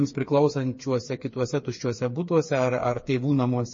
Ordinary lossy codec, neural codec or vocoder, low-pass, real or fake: MP3, 32 kbps; codec, 16 kHz, 4 kbps, FreqCodec, smaller model; 7.2 kHz; fake